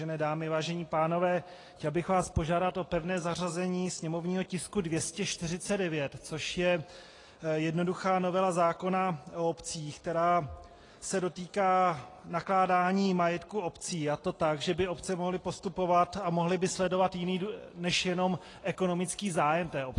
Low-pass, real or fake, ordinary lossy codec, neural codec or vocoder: 10.8 kHz; real; AAC, 32 kbps; none